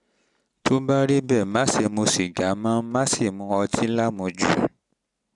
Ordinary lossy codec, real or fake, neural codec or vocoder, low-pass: none; real; none; 10.8 kHz